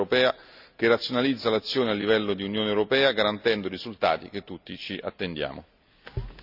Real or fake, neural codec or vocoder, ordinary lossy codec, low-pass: real; none; none; 5.4 kHz